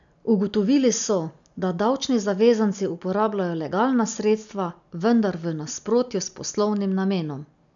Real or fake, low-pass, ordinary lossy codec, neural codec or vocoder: real; 7.2 kHz; none; none